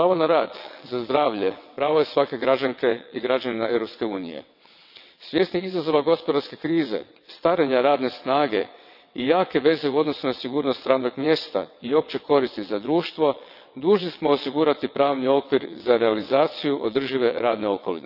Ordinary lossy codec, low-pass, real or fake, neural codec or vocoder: none; 5.4 kHz; fake; vocoder, 22.05 kHz, 80 mel bands, WaveNeXt